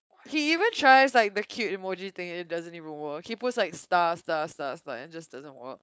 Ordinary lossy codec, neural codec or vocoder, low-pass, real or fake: none; codec, 16 kHz, 4.8 kbps, FACodec; none; fake